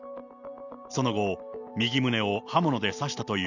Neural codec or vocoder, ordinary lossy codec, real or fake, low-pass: none; none; real; 7.2 kHz